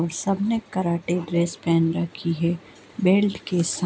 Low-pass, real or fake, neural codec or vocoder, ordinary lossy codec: none; real; none; none